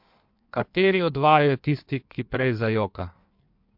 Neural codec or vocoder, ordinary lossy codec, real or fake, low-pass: codec, 16 kHz in and 24 kHz out, 1.1 kbps, FireRedTTS-2 codec; MP3, 48 kbps; fake; 5.4 kHz